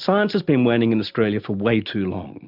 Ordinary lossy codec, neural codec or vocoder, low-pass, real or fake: AAC, 48 kbps; none; 5.4 kHz; real